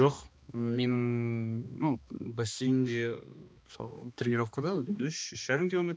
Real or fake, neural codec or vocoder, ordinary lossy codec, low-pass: fake; codec, 16 kHz, 2 kbps, X-Codec, HuBERT features, trained on balanced general audio; none; none